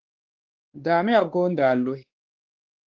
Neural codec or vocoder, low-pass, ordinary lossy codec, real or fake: codec, 16 kHz, 2 kbps, X-Codec, WavLM features, trained on Multilingual LibriSpeech; 7.2 kHz; Opus, 16 kbps; fake